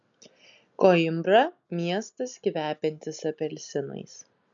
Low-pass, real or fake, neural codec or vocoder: 7.2 kHz; real; none